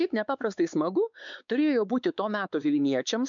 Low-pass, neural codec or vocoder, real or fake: 7.2 kHz; codec, 16 kHz, 4 kbps, X-Codec, WavLM features, trained on Multilingual LibriSpeech; fake